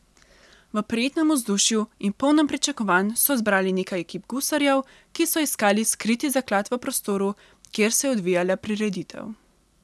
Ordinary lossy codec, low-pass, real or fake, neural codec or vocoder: none; none; real; none